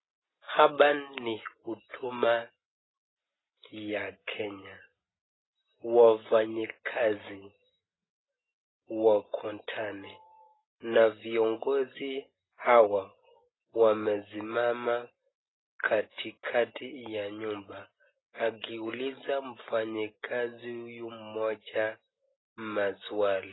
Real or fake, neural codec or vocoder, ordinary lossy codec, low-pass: real; none; AAC, 16 kbps; 7.2 kHz